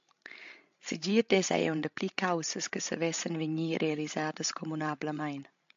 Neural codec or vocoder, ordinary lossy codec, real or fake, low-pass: codec, 16 kHz, 16 kbps, FreqCodec, larger model; AAC, 64 kbps; fake; 7.2 kHz